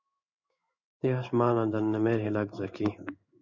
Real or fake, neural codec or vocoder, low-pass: fake; codec, 16 kHz in and 24 kHz out, 1 kbps, XY-Tokenizer; 7.2 kHz